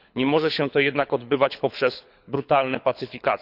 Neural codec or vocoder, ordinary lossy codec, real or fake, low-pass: codec, 24 kHz, 6 kbps, HILCodec; none; fake; 5.4 kHz